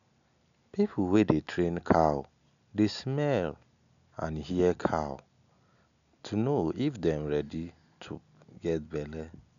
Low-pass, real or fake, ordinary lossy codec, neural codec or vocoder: 7.2 kHz; real; none; none